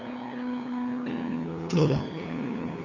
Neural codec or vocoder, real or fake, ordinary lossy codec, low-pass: codec, 16 kHz, 8 kbps, FunCodec, trained on LibriTTS, 25 frames a second; fake; none; 7.2 kHz